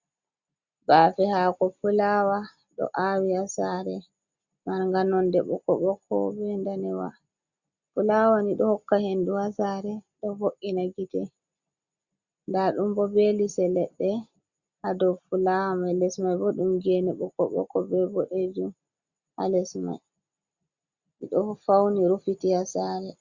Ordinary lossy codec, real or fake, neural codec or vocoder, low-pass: Opus, 64 kbps; real; none; 7.2 kHz